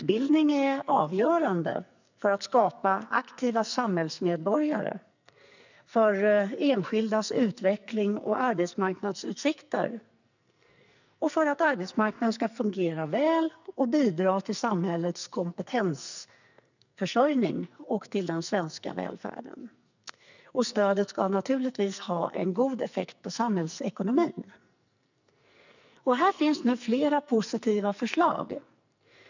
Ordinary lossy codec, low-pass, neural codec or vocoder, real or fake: none; 7.2 kHz; codec, 44.1 kHz, 2.6 kbps, SNAC; fake